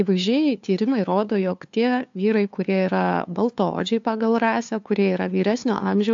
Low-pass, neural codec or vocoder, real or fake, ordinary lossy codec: 7.2 kHz; codec, 16 kHz, 2 kbps, FunCodec, trained on LibriTTS, 25 frames a second; fake; AAC, 64 kbps